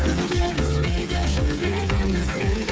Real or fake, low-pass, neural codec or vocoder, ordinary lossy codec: fake; none; codec, 16 kHz, 8 kbps, FreqCodec, smaller model; none